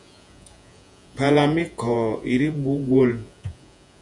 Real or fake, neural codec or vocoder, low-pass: fake; vocoder, 48 kHz, 128 mel bands, Vocos; 10.8 kHz